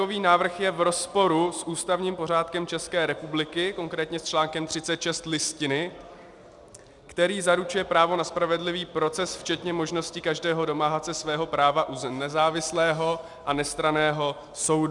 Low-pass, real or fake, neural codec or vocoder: 10.8 kHz; real; none